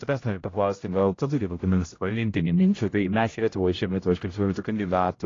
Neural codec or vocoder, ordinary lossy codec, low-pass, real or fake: codec, 16 kHz, 0.5 kbps, X-Codec, HuBERT features, trained on general audio; AAC, 32 kbps; 7.2 kHz; fake